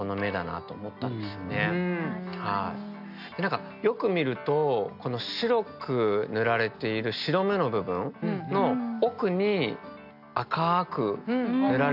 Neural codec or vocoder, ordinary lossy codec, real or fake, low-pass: none; none; real; 5.4 kHz